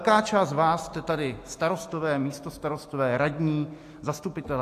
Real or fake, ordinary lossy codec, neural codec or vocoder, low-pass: real; AAC, 64 kbps; none; 14.4 kHz